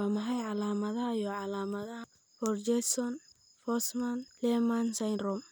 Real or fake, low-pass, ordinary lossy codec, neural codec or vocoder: real; none; none; none